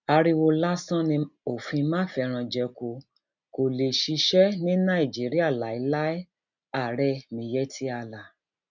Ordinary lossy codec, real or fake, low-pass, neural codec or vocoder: none; real; 7.2 kHz; none